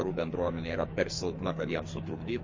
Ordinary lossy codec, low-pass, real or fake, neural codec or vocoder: MP3, 32 kbps; 7.2 kHz; fake; codec, 44.1 kHz, 2.6 kbps, SNAC